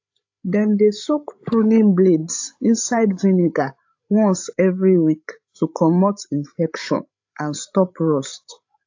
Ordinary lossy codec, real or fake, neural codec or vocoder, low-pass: AAC, 48 kbps; fake; codec, 16 kHz, 8 kbps, FreqCodec, larger model; 7.2 kHz